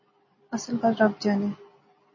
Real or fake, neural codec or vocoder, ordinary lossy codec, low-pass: real; none; MP3, 32 kbps; 7.2 kHz